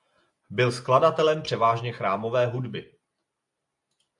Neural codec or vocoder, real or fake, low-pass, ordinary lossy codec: none; real; 10.8 kHz; AAC, 64 kbps